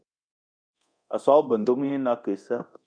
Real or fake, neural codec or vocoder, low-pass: fake; codec, 24 kHz, 0.9 kbps, DualCodec; 9.9 kHz